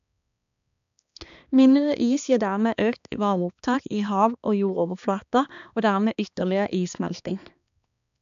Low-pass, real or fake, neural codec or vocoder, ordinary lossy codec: 7.2 kHz; fake; codec, 16 kHz, 2 kbps, X-Codec, HuBERT features, trained on balanced general audio; AAC, 96 kbps